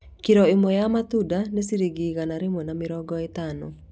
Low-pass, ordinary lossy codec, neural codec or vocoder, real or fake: none; none; none; real